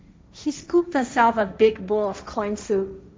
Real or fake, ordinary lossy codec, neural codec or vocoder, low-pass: fake; none; codec, 16 kHz, 1.1 kbps, Voila-Tokenizer; none